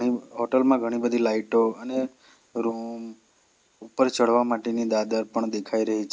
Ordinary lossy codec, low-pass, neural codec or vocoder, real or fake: none; none; none; real